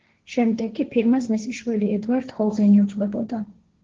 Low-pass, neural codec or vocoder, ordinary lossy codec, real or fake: 7.2 kHz; codec, 16 kHz, 1.1 kbps, Voila-Tokenizer; Opus, 16 kbps; fake